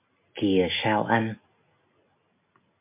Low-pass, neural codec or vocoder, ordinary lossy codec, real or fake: 3.6 kHz; none; MP3, 32 kbps; real